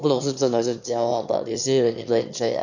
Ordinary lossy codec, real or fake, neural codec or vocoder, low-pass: none; fake; autoencoder, 22.05 kHz, a latent of 192 numbers a frame, VITS, trained on one speaker; 7.2 kHz